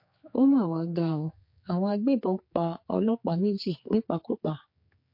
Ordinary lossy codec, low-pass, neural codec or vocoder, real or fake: MP3, 32 kbps; 5.4 kHz; codec, 16 kHz, 4 kbps, X-Codec, HuBERT features, trained on general audio; fake